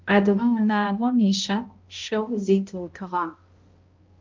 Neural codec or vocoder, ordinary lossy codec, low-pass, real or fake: codec, 16 kHz, 0.5 kbps, X-Codec, HuBERT features, trained on balanced general audio; Opus, 24 kbps; 7.2 kHz; fake